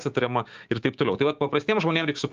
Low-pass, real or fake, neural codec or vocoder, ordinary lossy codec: 7.2 kHz; fake; codec, 16 kHz, 6 kbps, DAC; Opus, 32 kbps